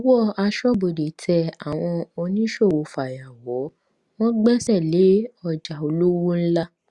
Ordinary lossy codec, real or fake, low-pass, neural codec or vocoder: Opus, 64 kbps; real; 10.8 kHz; none